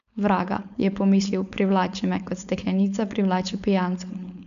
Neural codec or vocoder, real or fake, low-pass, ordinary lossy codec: codec, 16 kHz, 4.8 kbps, FACodec; fake; 7.2 kHz; MP3, 96 kbps